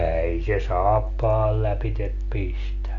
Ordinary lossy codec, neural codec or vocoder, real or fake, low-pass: none; none; real; 7.2 kHz